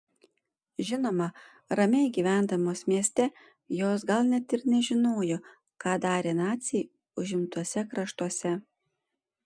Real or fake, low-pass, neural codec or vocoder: real; 9.9 kHz; none